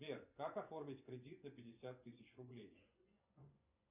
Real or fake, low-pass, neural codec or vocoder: fake; 3.6 kHz; vocoder, 24 kHz, 100 mel bands, Vocos